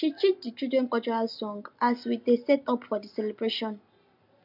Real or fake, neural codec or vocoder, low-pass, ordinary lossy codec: real; none; 5.4 kHz; MP3, 48 kbps